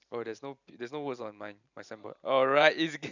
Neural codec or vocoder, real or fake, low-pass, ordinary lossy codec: vocoder, 44.1 kHz, 128 mel bands every 512 samples, BigVGAN v2; fake; 7.2 kHz; none